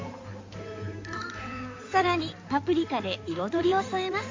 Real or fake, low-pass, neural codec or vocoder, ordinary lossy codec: fake; 7.2 kHz; codec, 16 kHz in and 24 kHz out, 2.2 kbps, FireRedTTS-2 codec; MP3, 48 kbps